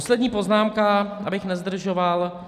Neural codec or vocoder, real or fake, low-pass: none; real; 14.4 kHz